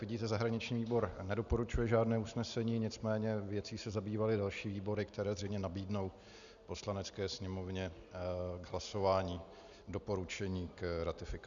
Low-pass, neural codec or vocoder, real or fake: 7.2 kHz; none; real